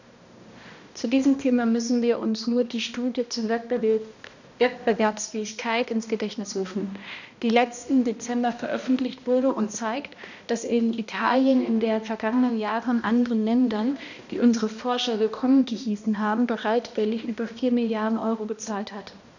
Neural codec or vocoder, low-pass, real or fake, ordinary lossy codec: codec, 16 kHz, 1 kbps, X-Codec, HuBERT features, trained on balanced general audio; 7.2 kHz; fake; none